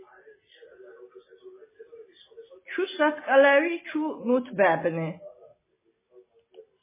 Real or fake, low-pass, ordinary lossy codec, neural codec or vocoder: real; 3.6 kHz; MP3, 16 kbps; none